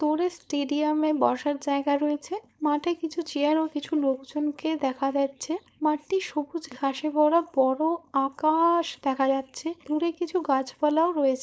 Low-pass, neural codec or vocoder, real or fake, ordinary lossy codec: none; codec, 16 kHz, 4.8 kbps, FACodec; fake; none